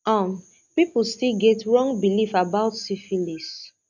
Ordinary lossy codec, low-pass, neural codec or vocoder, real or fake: none; 7.2 kHz; none; real